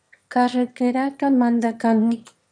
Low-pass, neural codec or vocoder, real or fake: 9.9 kHz; autoencoder, 22.05 kHz, a latent of 192 numbers a frame, VITS, trained on one speaker; fake